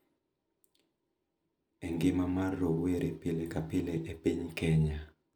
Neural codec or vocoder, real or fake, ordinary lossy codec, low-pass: none; real; none; none